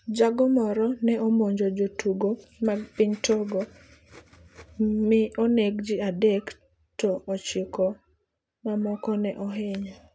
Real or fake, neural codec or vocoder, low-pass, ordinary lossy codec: real; none; none; none